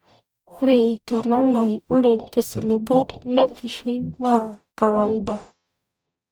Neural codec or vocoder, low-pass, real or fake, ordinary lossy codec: codec, 44.1 kHz, 0.9 kbps, DAC; none; fake; none